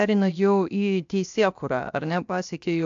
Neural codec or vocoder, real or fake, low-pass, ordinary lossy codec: codec, 16 kHz, 0.7 kbps, FocalCodec; fake; 7.2 kHz; AAC, 64 kbps